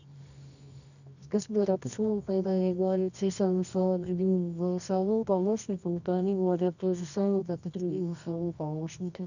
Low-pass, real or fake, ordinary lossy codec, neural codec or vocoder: 7.2 kHz; fake; none; codec, 24 kHz, 0.9 kbps, WavTokenizer, medium music audio release